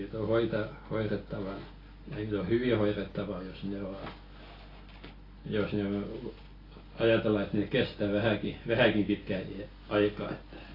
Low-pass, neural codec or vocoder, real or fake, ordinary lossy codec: 5.4 kHz; none; real; AAC, 24 kbps